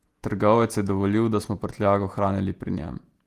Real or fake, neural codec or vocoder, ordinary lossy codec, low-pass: fake; vocoder, 48 kHz, 128 mel bands, Vocos; Opus, 24 kbps; 14.4 kHz